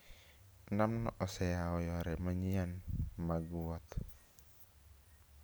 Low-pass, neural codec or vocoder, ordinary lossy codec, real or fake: none; none; none; real